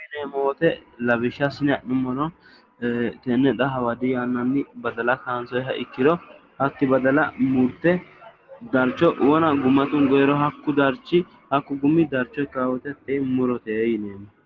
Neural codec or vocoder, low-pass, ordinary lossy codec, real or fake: none; 7.2 kHz; Opus, 16 kbps; real